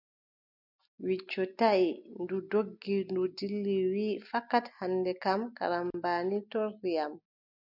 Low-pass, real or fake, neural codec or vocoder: 5.4 kHz; real; none